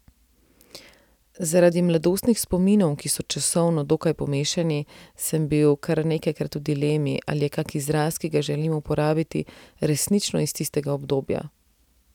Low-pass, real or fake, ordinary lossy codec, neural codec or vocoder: 19.8 kHz; real; none; none